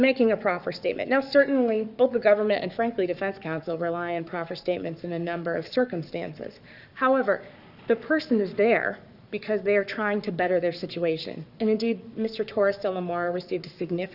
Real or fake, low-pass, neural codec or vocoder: fake; 5.4 kHz; codec, 44.1 kHz, 7.8 kbps, Pupu-Codec